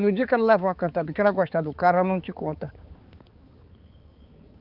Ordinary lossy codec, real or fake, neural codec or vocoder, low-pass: Opus, 24 kbps; fake; codec, 16 kHz, 4 kbps, X-Codec, HuBERT features, trained on balanced general audio; 5.4 kHz